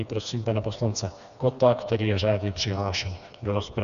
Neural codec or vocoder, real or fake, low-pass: codec, 16 kHz, 2 kbps, FreqCodec, smaller model; fake; 7.2 kHz